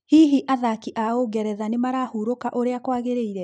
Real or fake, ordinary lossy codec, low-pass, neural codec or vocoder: real; none; 9.9 kHz; none